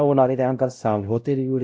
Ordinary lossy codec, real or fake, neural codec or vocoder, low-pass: none; fake; codec, 16 kHz, 0.5 kbps, X-Codec, WavLM features, trained on Multilingual LibriSpeech; none